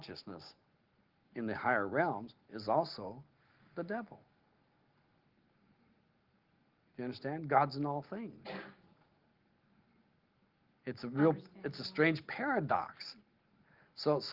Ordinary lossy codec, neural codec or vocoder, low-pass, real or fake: Opus, 16 kbps; none; 5.4 kHz; real